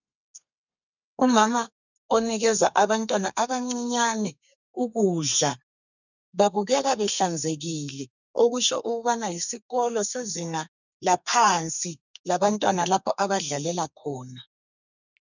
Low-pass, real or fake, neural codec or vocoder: 7.2 kHz; fake; codec, 32 kHz, 1.9 kbps, SNAC